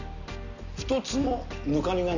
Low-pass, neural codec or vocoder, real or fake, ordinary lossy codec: 7.2 kHz; none; real; none